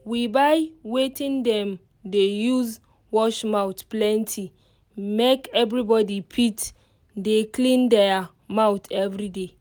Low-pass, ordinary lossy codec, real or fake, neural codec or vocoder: none; none; real; none